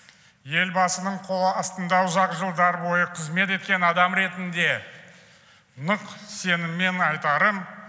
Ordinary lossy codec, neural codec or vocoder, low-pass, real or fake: none; none; none; real